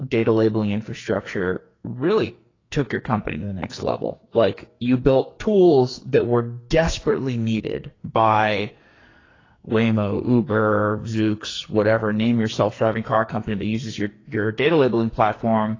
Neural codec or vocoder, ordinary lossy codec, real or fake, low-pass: codec, 44.1 kHz, 2.6 kbps, SNAC; AAC, 32 kbps; fake; 7.2 kHz